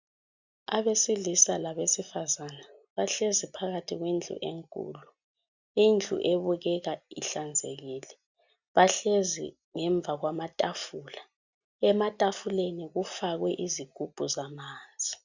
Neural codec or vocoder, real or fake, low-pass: none; real; 7.2 kHz